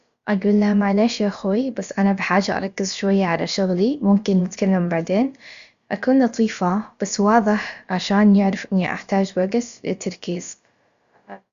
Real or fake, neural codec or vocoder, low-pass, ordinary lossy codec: fake; codec, 16 kHz, about 1 kbps, DyCAST, with the encoder's durations; 7.2 kHz; Opus, 64 kbps